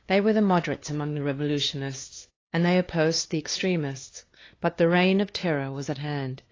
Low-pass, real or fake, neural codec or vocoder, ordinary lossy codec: 7.2 kHz; fake; codec, 16 kHz, 2 kbps, FunCodec, trained on LibriTTS, 25 frames a second; AAC, 32 kbps